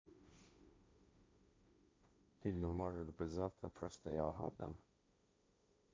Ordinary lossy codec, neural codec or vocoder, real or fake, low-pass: none; codec, 16 kHz, 1.1 kbps, Voila-Tokenizer; fake; none